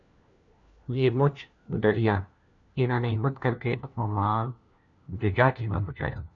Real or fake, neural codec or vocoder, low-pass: fake; codec, 16 kHz, 1 kbps, FunCodec, trained on LibriTTS, 50 frames a second; 7.2 kHz